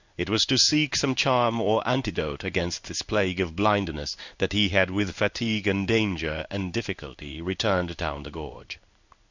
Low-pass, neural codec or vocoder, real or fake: 7.2 kHz; codec, 16 kHz in and 24 kHz out, 1 kbps, XY-Tokenizer; fake